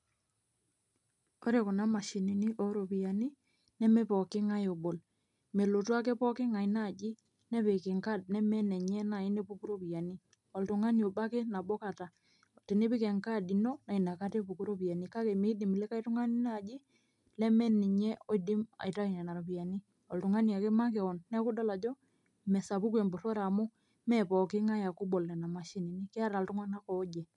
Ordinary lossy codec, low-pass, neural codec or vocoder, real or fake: none; 10.8 kHz; none; real